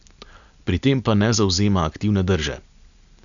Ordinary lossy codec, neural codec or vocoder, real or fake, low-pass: none; none; real; 7.2 kHz